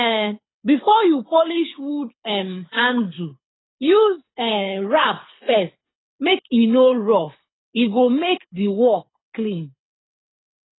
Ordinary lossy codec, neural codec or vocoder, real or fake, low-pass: AAC, 16 kbps; codec, 24 kHz, 6 kbps, HILCodec; fake; 7.2 kHz